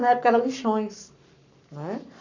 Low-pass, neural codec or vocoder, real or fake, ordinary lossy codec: 7.2 kHz; codec, 44.1 kHz, 7.8 kbps, Pupu-Codec; fake; none